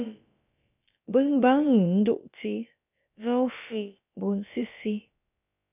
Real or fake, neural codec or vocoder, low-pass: fake; codec, 16 kHz, about 1 kbps, DyCAST, with the encoder's durations; 3.6 kHz